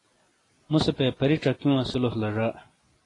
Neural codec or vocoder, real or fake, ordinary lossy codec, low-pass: none; real; AAC, 32 kbps; 10.8 kHz